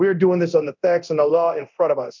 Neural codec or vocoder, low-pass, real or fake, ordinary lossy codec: codec, 24 kHz, 0.9 kbps, DualCodec; 7.2 kHz; fake; MP3, 64 kbps